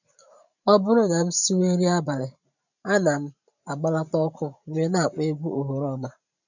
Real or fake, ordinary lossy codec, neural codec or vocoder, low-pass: fake; none; vocoder, 44.1 kHz, 128 mel bands every 512 samples, BigVGAN v2; 7.2 kHz